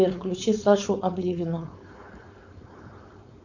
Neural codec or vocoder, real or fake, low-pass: codec, 16 kHz, 4.8 kbps, FACodec; fake; 7.2 kHz